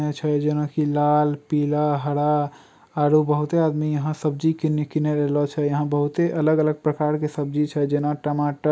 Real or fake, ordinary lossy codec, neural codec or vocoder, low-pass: real; none; none; none